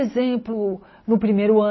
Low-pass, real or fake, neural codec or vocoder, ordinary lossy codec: 7.2 kHz; real; none; MP3, 24 kbps